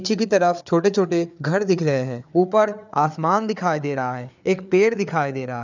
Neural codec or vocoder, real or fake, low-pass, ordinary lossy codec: codec, 16 kHz, 4 kbps, FreqCodec, larger model; fake; 7.2 kHz; none